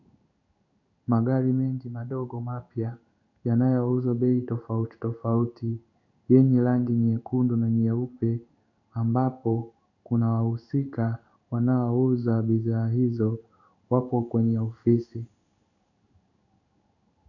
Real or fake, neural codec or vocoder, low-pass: fake; codec, 16 kHz in and 24 kHz out, 1 kbps, XY-Tokenizer; 7.2 kHz